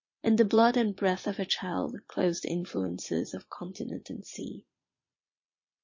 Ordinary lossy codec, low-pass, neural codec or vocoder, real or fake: MP3, 32 kbps; 7.2 kHz; vocoder, 22.05 kHz, 80 mel bands, Vocos; fake